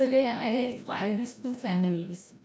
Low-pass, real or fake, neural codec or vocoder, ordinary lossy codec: none; fake; codec, 16 kHz, 0.5 kbps, FreqCodec, larger model; none